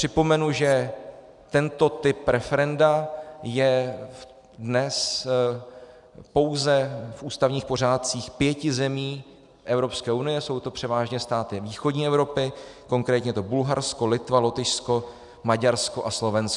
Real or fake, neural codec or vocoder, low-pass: real; none; 10.8 kHz